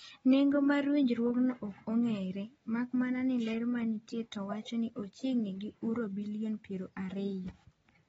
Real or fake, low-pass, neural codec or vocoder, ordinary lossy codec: real; 19.8 kHz; none; AAC, 24 kbps